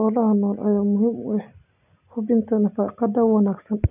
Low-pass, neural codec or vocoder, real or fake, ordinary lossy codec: 3.6 kHz; none; real; none